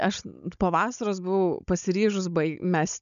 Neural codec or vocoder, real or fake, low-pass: codec, 16 kHz, 16 kbps, FunCodec, trained on Chinese and English, 50 frames a second; fake; 7.2 kHz